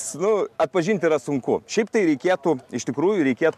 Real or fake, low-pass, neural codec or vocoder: real; 14.4 kHz; none